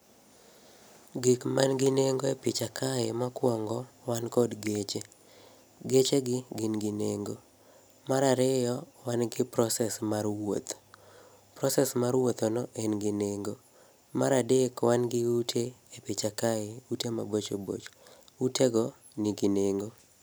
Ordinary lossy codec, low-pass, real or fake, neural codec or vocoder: none; none; real; none